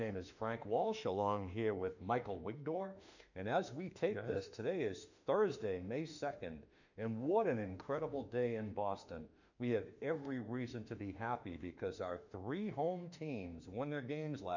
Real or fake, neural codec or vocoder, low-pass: fake; autoencoder, 48 kHz, 32 numbers a frame, DAC-VAE, trained on Japanese speech; 7.2 kHz